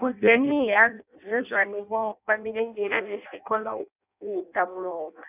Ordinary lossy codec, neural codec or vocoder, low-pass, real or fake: none; codec, 16 kHz in and 24 kHz out, 0.6 kbps, FireRedTTS-2 codec; 3.6 kHz; fake